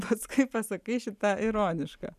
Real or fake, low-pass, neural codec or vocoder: real; 14.4 kHz; none